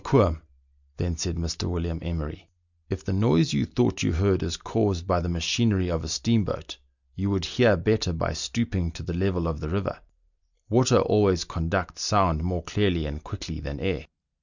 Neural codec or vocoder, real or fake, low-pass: none; real; 7.2 kHz